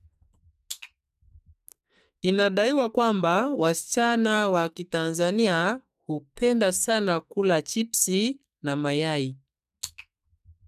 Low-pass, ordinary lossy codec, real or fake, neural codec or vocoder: 14.4 kHz; none; fake; codec, 44.1 kHz, 2.6 kbps, SNAC